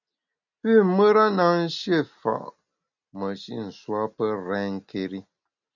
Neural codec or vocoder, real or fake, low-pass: none; real; 7.2 kHz